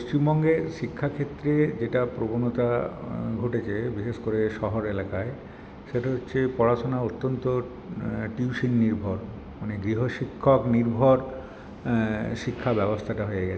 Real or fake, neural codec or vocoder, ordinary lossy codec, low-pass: real; none; none; none